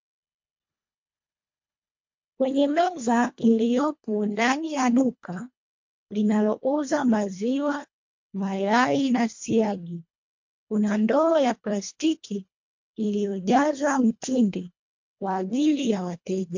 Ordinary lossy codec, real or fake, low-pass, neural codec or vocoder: MP3, 48 kbps; fake; 7.2 kHz; codec, 24 kHz, 1.5 kbps, HILCodec